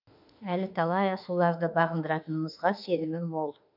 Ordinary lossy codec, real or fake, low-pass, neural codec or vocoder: none; fake; 5.4 kHz; autoencoder, 48 kHz, 32 numbers a frame, DAC-VAE, trained on Japanese speech